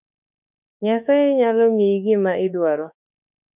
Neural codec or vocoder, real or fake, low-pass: autoencoder, 48 kHz, 32 numbers a frame, DAC-VAE, trained on Japanese speech; fake; 3.6 kHz